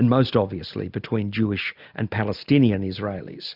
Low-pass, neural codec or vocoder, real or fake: 5.4 kHz; none; real